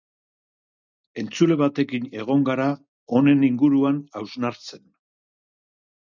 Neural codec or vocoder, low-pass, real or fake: none; 7.2 kHz; real